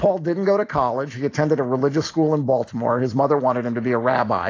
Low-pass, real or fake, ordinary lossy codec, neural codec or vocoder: 7.2 kHz; real; AAC, 32 kbps; none